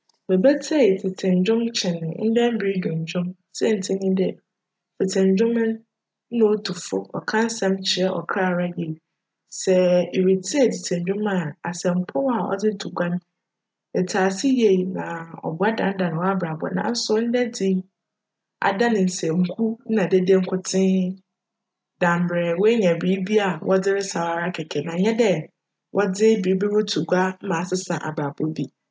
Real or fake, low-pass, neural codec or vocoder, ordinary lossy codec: real; none; none; none